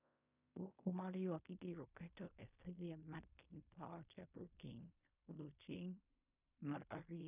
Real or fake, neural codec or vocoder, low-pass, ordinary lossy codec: fake; codec, 16 kHz in and 24 kHz out, 0.4 kbps, LongCat-Audio-Codec, fine tuned four codebook decoder; 3.6 kHz; none